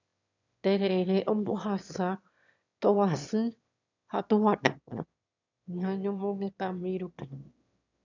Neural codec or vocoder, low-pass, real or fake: autoencoder, 22.05 kHz, a latent of 192 numbers a frame, VITS, trained on one speaker; 7.2 kHz; fake